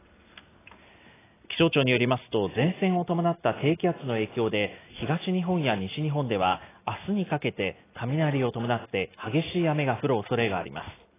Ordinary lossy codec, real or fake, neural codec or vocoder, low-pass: AAC, 16 kbps; real; none; 3.6 kHz